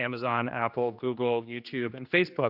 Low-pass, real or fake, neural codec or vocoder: 5.4 kHz; fake; codec, 16 kHz, 2 kbps, X-Codec, HuBERT features, trained on general audio